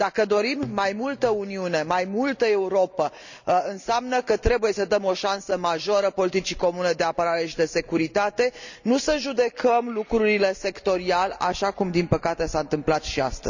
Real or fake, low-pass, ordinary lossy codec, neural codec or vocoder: real; 7.2 kHz; none; none